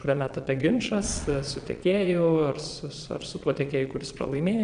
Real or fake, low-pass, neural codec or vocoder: fake; 9.9 kHz; vocoder, 22.05 kHz, 80 mel bands, Vocos